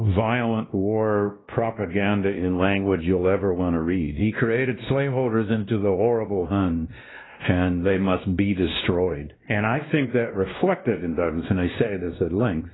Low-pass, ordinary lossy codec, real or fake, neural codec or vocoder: 7.2 kHz; AAC, 16 kbps; fake; codec, 16 kHz, 1 kbps, X-Codec, WavLM features, trained on Multilingual LibriSpeech